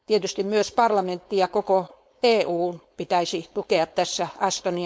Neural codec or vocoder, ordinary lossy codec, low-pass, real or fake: codec, 16 kHz, 4.8 kbps, FACodec; none; none; fake